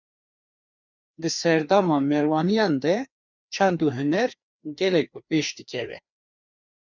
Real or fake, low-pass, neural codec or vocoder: fake; 7.2 kHz; codec, 16 kHz, 2 kbps, FreqCodec, larger model